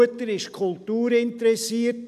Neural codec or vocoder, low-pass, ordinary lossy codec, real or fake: none; 14.4 kHz; MP3, 96 kbps; real